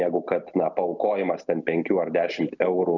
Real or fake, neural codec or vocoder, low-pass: real; none; 7.2 kHz